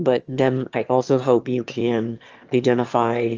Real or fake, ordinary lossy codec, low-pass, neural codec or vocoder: fake; Opus, 24 kbps; 7.2 kHz; autoencoder, 22.05 kHz, a latent of 192 numbers a frame, VITS, trained on one speaker